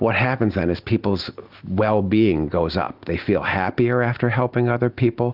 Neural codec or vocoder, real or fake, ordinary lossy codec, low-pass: none; real; Opus, 32 kbps; 5.4 kHz